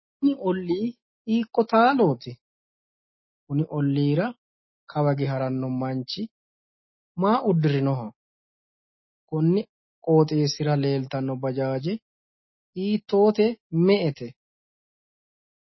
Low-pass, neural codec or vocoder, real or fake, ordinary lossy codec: 7.2 kHz; none; real; MP3, 24 kbps